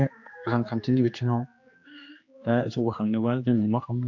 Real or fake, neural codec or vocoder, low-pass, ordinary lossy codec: fake; codec, 16 kHz, 2 kbps, X-Codec, HuBERT features, trained on general audio; 7.2 kHz; AAC, 48 kbps